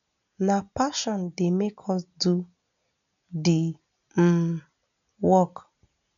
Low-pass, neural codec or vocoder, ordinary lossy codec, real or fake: 7.2 kHz; none; none; real